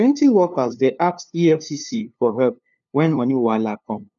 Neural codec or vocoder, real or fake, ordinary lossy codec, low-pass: codec, 16 kHz, 2 kbps, FunCodec, trained on LibriTTS, 25 frames a second; fake; none; 7.2 kHz